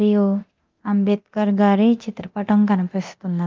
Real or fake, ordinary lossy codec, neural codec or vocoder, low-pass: fake; Opus, 24 kbps; codec, 24 kHz, 0.9 kbps, DualCodec; 7.2 kHz